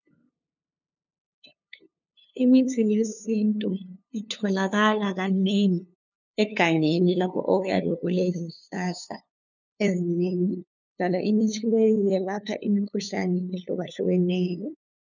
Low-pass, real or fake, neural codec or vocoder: 7.2 kHz; fake; codec, 16 kHz, 2 kbps, FunCodec, trained on LibriTTS, 25 frames a second